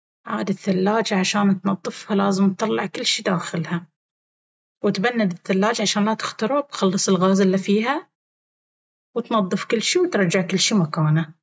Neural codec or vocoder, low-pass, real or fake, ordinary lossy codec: none; none; real; none